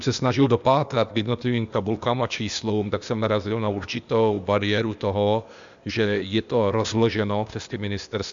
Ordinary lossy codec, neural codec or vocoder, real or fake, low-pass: Opus, 64 kbps; codec, 16 kHz, 0.8 kbps, ZipCodec; fake; 7.2 kHz